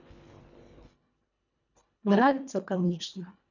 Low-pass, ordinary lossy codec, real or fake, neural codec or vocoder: 7.2 kHz; none; fake; codec, 24 kHz, 1.5 kbps, HILCodec